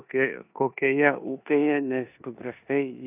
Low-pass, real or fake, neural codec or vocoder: 3.6 kHz; fake; codec, 16 kHz in and 24 kHz out, 0.9 kbps, LongCat-Audio-Codec, four codebook decoder